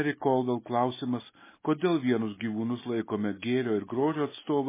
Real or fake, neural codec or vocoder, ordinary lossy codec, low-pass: real; none; MP3, 16 kbps; 3.6 kHz